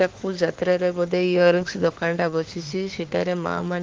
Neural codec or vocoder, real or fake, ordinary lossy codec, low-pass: autoencoder, 48 kHz, 32 numbers a frame, DAC-VAE, trained on Japanese speech; fake; Opus, 16 kbps; 7.2 kHz